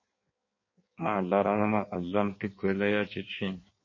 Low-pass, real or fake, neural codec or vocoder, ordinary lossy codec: 7.2 kHz; fake; codec, 16 kHz in and 24 kHz out, 1.1 kbps, FireRedTTS-2 codec; MP3, 32 kbps